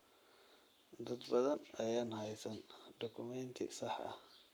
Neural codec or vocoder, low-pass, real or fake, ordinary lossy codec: codec, 44.1 kHz, 7.8 kbps, Pupu-Codec; none; fake; none